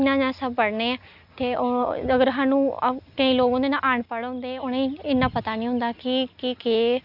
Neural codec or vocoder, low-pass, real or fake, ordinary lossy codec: none; 5.4 kHz; real; none